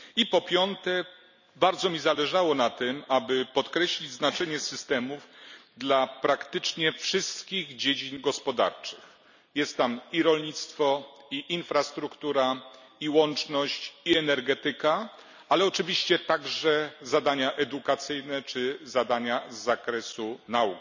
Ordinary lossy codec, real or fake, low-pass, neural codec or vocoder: none; real; 7.2 kHz; none